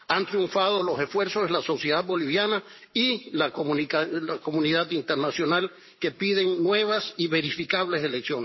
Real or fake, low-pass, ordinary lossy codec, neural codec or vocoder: fake; 7.2 kHz; MP3, 24 kbps; codec, 44.1 kHz, 7.8 kbps, Pupu-Codec